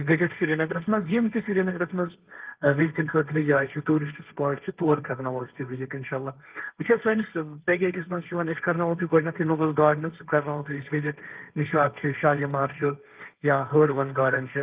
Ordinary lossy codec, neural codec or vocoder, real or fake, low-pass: Opus, 32 kbps; codec, 16 kHz, 1.1 kbps, Voila-Tokenizer; fake; 3.6 kHz